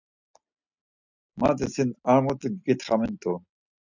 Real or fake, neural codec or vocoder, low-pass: real; none; 7.2 kHz